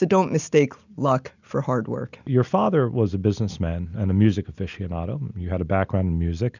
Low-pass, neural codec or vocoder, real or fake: 7.2 kHz; none; real